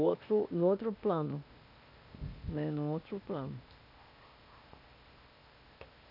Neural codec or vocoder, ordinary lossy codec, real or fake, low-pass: codec, 16 kHz, 0.8 kbps, ZipCodec; none; fake; 5.4 kHz